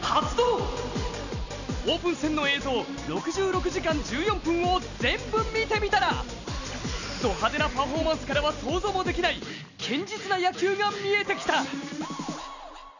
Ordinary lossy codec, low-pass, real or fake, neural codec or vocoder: none; 7.2 kHz; real; none